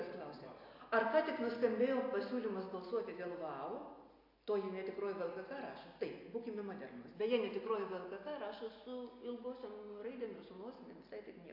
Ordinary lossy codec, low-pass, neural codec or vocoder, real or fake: AAC, 32 kbps; 5.4 kHz; none; real